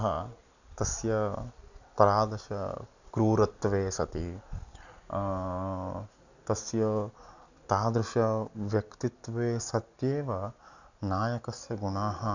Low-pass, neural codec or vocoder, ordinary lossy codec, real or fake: 7.2 kHz; none; none; real